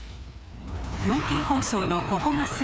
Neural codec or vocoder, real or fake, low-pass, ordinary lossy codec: codec, 16 kHz, 2 kbps, FreqCodec, larger model; fake; none; none